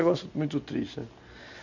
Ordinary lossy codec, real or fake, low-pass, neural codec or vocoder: none; real; 7.2 kHz; none